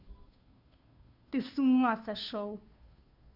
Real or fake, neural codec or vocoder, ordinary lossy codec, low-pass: fake; codec, 16 kHz, 2 kbps, FunCodec, trained on Chinese and English, 25 frames a second; none; 5.4 kHz